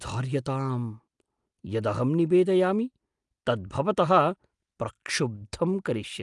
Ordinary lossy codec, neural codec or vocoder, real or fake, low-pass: Opus, 32 kbps; none; real; 10.8 kHz